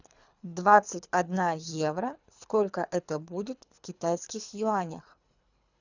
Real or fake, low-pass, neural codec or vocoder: fake; 7.2 kHz; codec, 24 kHz, 3 kbps, HILCodec